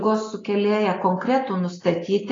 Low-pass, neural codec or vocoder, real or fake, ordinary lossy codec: 7.2 kHz; none; real; AAC, 32 kbps